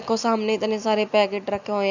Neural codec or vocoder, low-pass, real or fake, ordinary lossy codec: none; 7.2 kHz; real; none